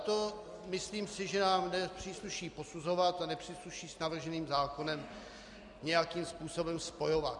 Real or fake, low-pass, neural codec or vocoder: real; 10.8 kHz; none